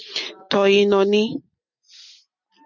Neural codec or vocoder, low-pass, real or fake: none; 7.2 kHz; real